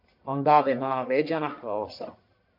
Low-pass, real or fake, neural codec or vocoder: 5.4 kHz; fake; codec, 44.1 kHz, 1.7 kbps, Pupu-Codec